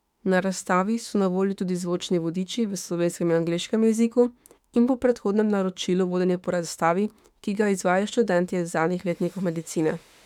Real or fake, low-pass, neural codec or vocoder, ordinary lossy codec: fake; 19.8 kHz; autoencoder, 48 kHz, 32 numbers a frame, DAC-VAE, trained on Japanese speech; none